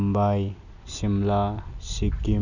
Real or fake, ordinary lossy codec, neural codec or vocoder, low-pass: real; none; none; 7.2 kHz